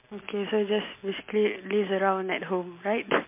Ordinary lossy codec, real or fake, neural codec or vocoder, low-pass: MP3, 24 kbps; real; none; 3.6 kHz